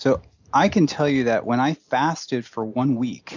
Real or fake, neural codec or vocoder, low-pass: real; none; 7.2 kHz